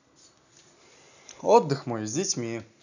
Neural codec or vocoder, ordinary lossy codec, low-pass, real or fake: none; none; 7.2 kHz; real